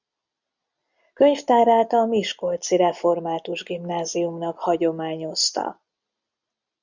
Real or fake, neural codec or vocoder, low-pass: real; none; 7.2 kHz